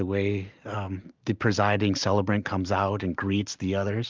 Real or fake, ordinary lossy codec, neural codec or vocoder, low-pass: real; Opus, 24 kbps; none; 7.2 kHz